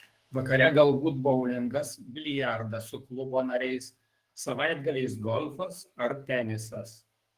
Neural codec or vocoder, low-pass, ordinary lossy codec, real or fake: codec, 44.1 kHz, 2.6 kbps, SNAC; 14.4 kHz; Opus, 24 kbps; fake